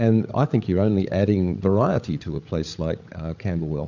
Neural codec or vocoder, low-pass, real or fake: codec, 16 kHz, 8 kbps, FreqCodec, larger model; 7.2 kHz; fake